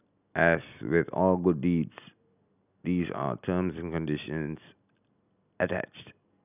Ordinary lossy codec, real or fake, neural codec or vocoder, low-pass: none; real; none; 3.6 kHz